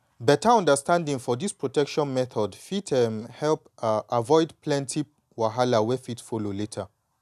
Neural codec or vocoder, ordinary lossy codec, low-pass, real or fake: none; none; 14.4 kHz; real